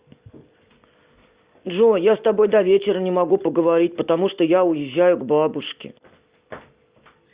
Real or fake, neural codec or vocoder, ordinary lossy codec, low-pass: fake; vocoder, 44.1 kHz, 128 mel bands every 256 samples, BigVGAN v2; Opus, 64 kbps; 3.6 kHz